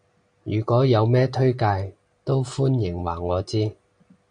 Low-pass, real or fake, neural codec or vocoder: 9.9 kHz; real; none